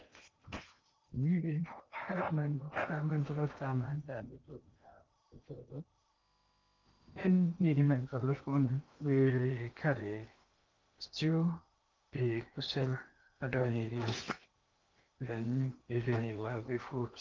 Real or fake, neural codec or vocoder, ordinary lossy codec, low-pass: fake; codec, 16 kHz in and 24 kHz out, 0.8 kbps, FocalCodec, streaming, 65536 codes; Opus, 24 kbps; 7.2 kHz